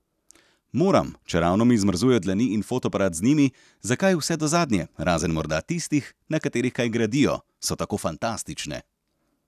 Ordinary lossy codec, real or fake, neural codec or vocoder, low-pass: none; real; none; 14.4 kHz